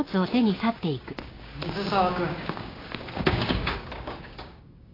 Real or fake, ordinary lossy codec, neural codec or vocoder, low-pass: fake; AAC, 24 kbps; codec, 16 kHz, 6 kbps, DAC; 5.4 kHz